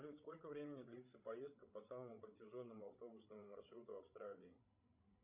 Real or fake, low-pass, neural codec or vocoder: fake; 3.6 kHz; codec, 16 kHz, 16 kbps, FreqCodec, larger model